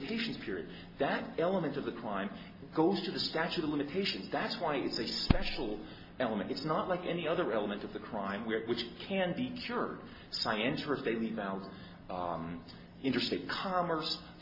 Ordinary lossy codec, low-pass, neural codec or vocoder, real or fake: MP3, 24 kbps; 5.4 kHz; none; real